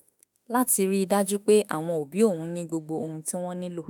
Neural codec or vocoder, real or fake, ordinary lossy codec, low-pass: autoencoder, 48 kHz, 32 numbers a frame, DAC-VAE, trained on Japanese speech; fake; none; none